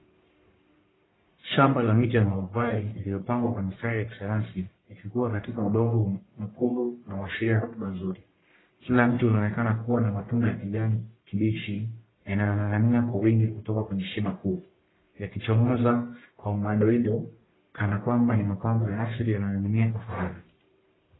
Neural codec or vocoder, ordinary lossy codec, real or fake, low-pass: codec, 44.1 kHz, 1.7 kbps, Pupu-Codec; AAC, 16 kbps; fake; 7.2 kHz